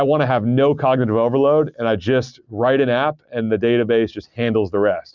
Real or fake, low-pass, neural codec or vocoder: real; 7.2 kHz; none